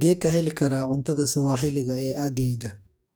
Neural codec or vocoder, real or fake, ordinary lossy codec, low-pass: codec, 44.1 kHz, 2.6 kbps, DAC; fake; none; none